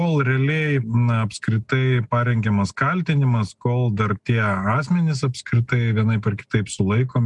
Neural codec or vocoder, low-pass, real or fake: none; 10.8 kHz; real